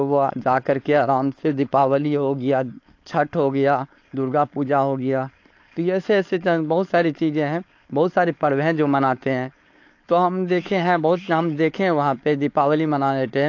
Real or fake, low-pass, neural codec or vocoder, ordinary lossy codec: fake; 7.2 kHz; codec, 16 kHz, 4.8 kbps, FACodec; AAC, 48 kbps